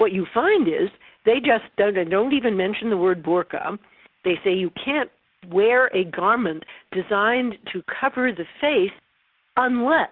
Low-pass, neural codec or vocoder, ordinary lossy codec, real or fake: 5.4 kHz; none; Opus, 32 kbps; real